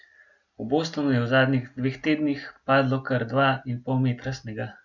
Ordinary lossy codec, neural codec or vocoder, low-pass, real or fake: none; none; 7.2 kHz; real